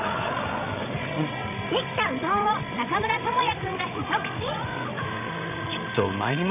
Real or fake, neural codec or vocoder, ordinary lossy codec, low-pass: fake; codec, 16 kHz, 16 kbps, FreqCodec, larger model; none; 3.6 kHz